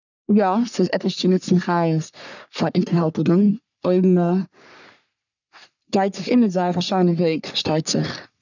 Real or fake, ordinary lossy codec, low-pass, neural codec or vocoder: fake; none; 7.2 kHz; codec, 44.1 kHz, 3.4 kbps, Pupu-Codec